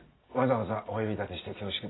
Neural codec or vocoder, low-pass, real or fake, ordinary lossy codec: none; 7.2 kHz; real; AAC, 16 kbps